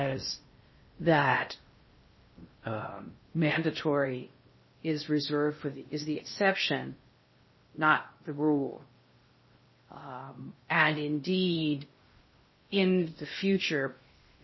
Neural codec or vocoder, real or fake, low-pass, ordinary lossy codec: codec, 16 kHz in and 24 kHz out, 0.6 kbps, FocalCodec, streaming, 4096 codes; fake; 7.2 kHz; MP3, 24 kbps